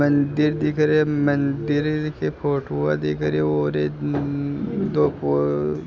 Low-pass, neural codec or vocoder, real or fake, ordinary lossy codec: none; none; real; none